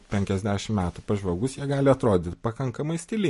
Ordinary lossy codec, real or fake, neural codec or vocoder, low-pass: MP3, 48 kbps; real; none; 14.4 kHz